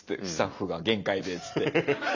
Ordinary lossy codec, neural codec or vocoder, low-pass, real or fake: none; none; 7.2 kHz; real